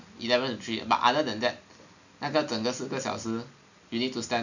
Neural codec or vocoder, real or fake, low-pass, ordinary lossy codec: none; real; 7.2 kHz; none